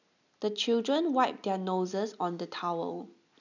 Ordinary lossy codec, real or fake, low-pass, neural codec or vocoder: none; real; 7.2 kHz; none